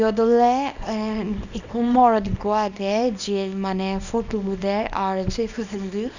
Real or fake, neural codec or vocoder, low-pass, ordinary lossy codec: fake; codec, 24 kHz, 0.9 kbps, WavTokenizer, small release; 7.2 kHz; none